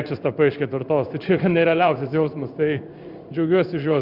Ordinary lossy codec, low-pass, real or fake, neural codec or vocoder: Opus, 64 kbps; 5.4 kHz; fake; codec, 16 kHz in and 24 kHz out, 1 kbps, XY-Tokenizer